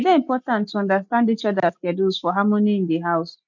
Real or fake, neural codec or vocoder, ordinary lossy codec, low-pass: real; none; MP3, 64 kbps; 7.2 kHz